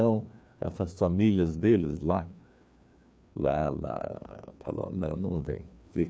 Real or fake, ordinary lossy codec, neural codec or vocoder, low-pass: fake; none; codec, 16 kHz, 2 kbps, FreqCodec, larger model; none